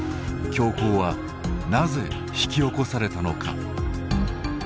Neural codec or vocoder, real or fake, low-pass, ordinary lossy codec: none; real; none; none